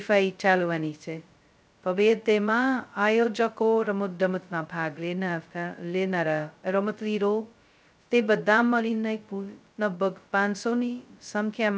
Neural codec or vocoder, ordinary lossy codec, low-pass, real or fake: codec, 16 kHz, 0.2 kbps, FocalCodec; none; none; fake